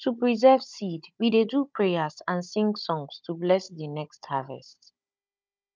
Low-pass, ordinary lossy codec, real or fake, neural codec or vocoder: none; none; fake; codec, 16 kHz, 16 kbps, FunCodec, trained on Chinese and English, 50 frames a second